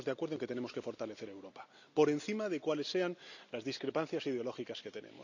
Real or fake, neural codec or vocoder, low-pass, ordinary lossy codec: real; none; 7.2 kHz; none